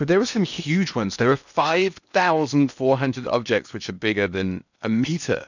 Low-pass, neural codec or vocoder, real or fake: 7.2 kHz; codec, 16 kHz in and 24 kHz out, 0.8 kbps, FocalCodec, streaming, 65536 codes; fake